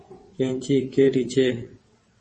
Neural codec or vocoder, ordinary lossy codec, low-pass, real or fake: vocoder, 22.05 kHz, 80 mel bands, WaveNeXt; MP3, 32 kbps; 9.9 kHz; fake